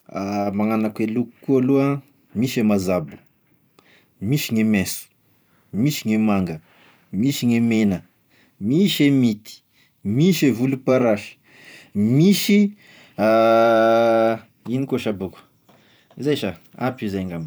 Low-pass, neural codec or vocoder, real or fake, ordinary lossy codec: none; vocoder, 44.1 kHz, 128 mel bands every 512 samples, BigVGAN v2; fake; none